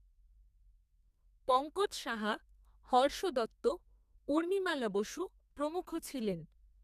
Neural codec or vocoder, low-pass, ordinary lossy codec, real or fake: codec, 32 kHz, 1.9 kbps, SNAC; 14.4 kHz; none; fake